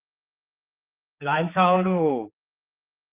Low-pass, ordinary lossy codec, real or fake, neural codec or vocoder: 3.6 kHz; Opus, 24 kbps; fake; codec, 16 kHz, 4 kbps, X-Codec, HuBERT features, trained on general audio